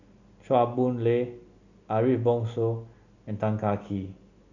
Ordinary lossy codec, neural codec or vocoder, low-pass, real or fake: none; none; 7.2 kHz; real